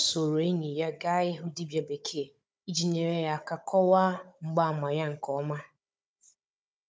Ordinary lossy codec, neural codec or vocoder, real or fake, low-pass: none; codec, 16 kHz, 16 kbps, FunCodec, trained on Chinese and English, 50 frames a second; fake; none